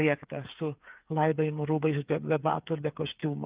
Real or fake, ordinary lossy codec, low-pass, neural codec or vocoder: fake; Opus, 24 kbps; 3.6 kHz; codec, 16 kHz, 8 kbps, FreqCodec, smaller model